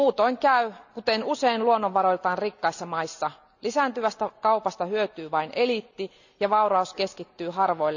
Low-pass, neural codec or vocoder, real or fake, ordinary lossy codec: 7.2 kHz; none; real; none